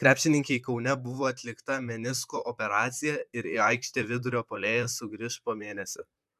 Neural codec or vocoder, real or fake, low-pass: vocoder, 44.1 kHz, 128 mel bands, Pupu-Vocoder; fake; 14.4 kHz